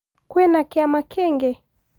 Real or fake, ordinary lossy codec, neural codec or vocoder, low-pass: real; Opus, 32 kbps; none; 19.8 kHz